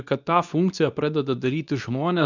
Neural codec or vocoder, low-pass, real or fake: codec, 24 kHz, 0.9 kbps, WavTokenizer, medium speech release version 2; 7.2 kHz; fake